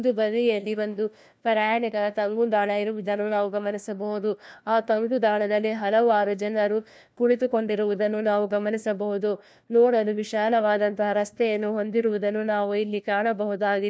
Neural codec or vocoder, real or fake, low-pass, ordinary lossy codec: codec, 16 kHz, 1 kbps, FunCodec, trained on LibriTTS, 50 frames a second; fake; none; none